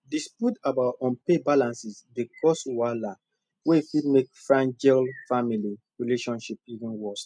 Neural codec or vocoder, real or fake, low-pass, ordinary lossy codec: none; real; 9.9 kHz; none